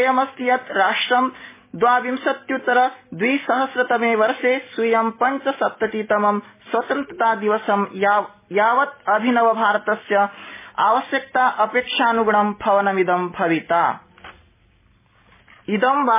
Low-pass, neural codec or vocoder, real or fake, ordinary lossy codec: 3.6 kHz; none; real; MP3, 16 kbps